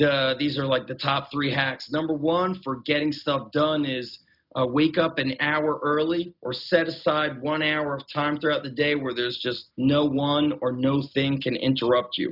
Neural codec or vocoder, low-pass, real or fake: none; 5.4 kHz; real